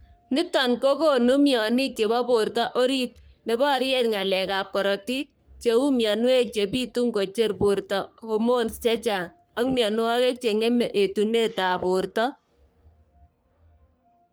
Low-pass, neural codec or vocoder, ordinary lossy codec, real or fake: none; codec, 44.1 kHz, 3.4 kbps, Pupu-Codec; none; fake